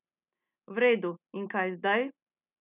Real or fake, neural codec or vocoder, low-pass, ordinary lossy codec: real; none; 3.6 kHz; none